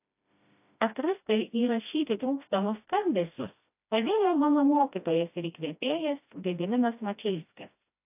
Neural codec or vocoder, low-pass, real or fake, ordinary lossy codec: codec, 16 kHz, 1 kbps, FreqCodec, smaller model; 3.6 kHz; fake; AAC, 32 kbps